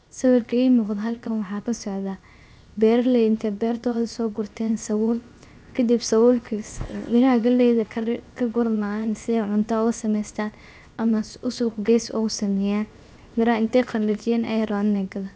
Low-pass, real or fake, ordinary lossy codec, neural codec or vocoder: none; fake; none; codec, 16 kHz, 0.7 kbps, FocalCodec